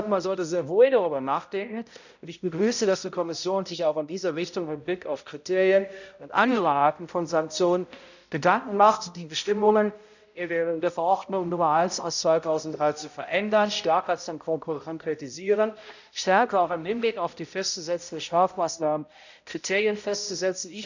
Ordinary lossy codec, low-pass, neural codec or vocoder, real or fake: none; 7.2 kHz; codec, 16 kHz, 0.5 kbps, X-Codec, HuBERT features, trained on balanced general audio; fake